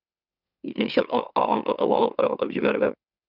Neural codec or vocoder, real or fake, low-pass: autoencoder, 44.1 kHz, a latent of 192 numbers a frame, MeloTTS; fake; 5.4 kHz